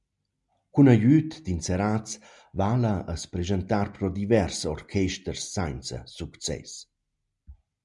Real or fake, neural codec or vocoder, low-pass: real; none; 10.8 kHz